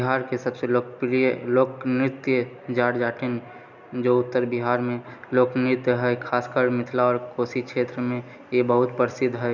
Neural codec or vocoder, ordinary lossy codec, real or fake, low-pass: none; none; real; 7.2 kHz